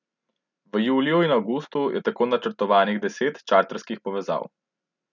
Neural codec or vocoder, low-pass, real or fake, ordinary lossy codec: none; 7.2 kHz; real; none